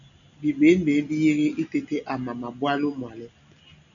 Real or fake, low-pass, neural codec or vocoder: real; 7.2 kHz; none